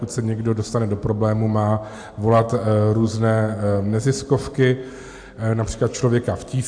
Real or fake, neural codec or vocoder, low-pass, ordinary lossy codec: real; none; 9.9 kHz; AAC, 64 kbps